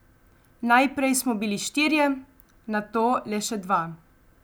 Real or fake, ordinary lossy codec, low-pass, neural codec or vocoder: real; none; none; none